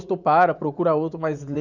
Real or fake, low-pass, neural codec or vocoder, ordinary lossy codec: fake; 7.2 kHz; vocoder, 44.1 kHz, 80 mel bands, Vocos; Opus, 64 kbps